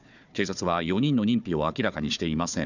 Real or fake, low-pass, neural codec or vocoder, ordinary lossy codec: fake; 7.2 kHz; codec, 16 kHz, 4 kbps, FunCodec, trained on Chinese and English, 50 frames a second; none